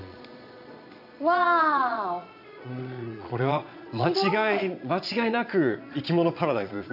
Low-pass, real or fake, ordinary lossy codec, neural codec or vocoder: 5.4 kHz; fake; none; vocoder, 22.05 kHz, 80 mel bands, Vocos